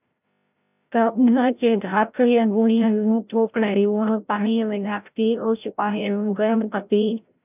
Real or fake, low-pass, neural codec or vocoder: fake; 3.6 kHz; codec, 16 kHz, 0.5 kbps, FreqCodec, larger model